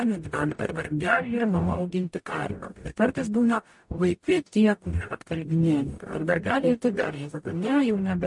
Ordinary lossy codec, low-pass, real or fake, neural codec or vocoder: MP3, 48 kbps; 10.8 kHz; fake; codec, 44.1 kHz, 0.9 kbps, DAC